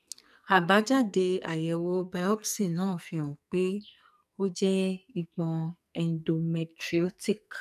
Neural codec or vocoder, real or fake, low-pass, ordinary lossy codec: codec, 44.1 kHz, 2.6 kbps, SNAC; fake; 14.4 kHz; none